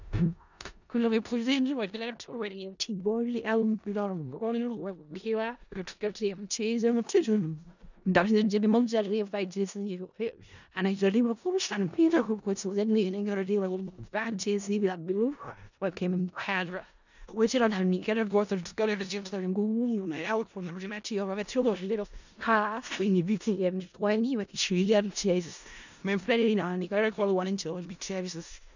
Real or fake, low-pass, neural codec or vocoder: fake; 7.2 kHz; codec, 16 kHz in and 24 kHz out, 0.4 kbps, LongCat-Audio-Codec, four codebook decoder